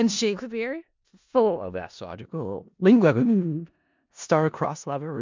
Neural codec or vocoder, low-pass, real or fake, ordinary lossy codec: codec, 16 kHz in and 24 kHz out, 0.4 kbps, LongCat-Audio-Codec, four codebook decoder; 7.2 kHz; fake; MP3, 64 kbps